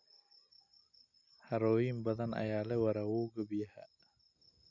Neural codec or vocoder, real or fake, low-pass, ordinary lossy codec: none; real; 7.2 kHz; none